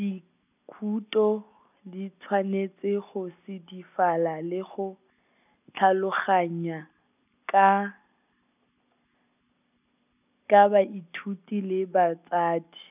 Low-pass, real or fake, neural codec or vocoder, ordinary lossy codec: 3.6 kHz; real; none; none